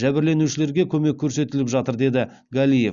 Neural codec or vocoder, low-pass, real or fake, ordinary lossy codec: none; 7.2 kHz; real; Opus, 64 kbps